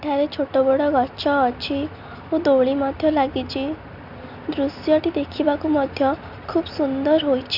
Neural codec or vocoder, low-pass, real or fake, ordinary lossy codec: none; 5.4 kHz; real; none